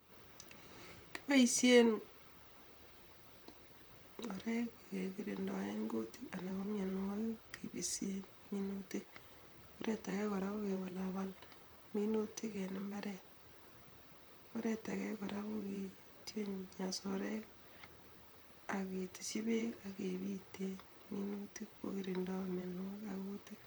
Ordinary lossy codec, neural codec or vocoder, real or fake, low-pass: none; vocoder, 44.1 kHz, 128 mel bands, Pupu-Vocoder; fake; none